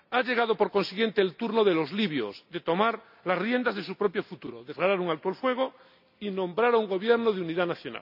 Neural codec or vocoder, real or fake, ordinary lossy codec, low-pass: none; real; none; 5.4 kHz